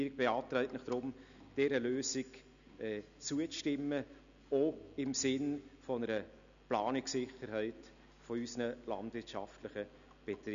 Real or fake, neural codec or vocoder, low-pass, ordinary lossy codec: real; none; 7.2 kHz; none